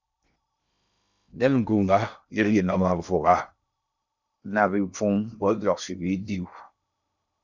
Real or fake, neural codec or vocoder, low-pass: fake; codec, 16 kHz in and 24 kHz out, 0.6 kbps, FocalCodec, streaming, 2048 codes; 7.2 kHz